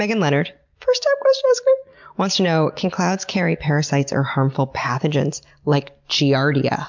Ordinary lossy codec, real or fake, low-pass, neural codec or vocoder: MP3, 64 kbps; real; 7.2 kHz; none